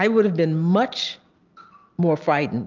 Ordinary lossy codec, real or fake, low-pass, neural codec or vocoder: Opus, 24 kbps; real; 7.2 kHz; none